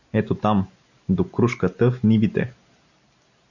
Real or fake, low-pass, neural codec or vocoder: real; 7.2 kHz; none